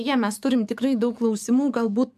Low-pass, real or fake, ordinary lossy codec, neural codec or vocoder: 14.4 kHz; fake; MP3, 96 kbps; codec, 44.1 kHz, 7.8 kbps, DAC